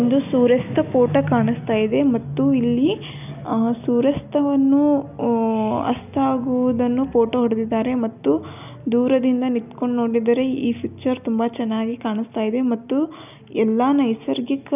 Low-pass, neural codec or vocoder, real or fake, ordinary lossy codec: 3.6 kHz; none; real; none